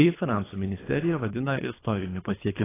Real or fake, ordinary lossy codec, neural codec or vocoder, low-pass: fake; AAC, 16 kbps; codec, 24 kHz, 3 kbps, HILCodec; 3.6 kHz